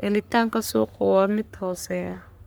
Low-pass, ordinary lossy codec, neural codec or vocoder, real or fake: none; none; codec, 44.1 kHz, 3.4 kbps, Pupu-Codec; fake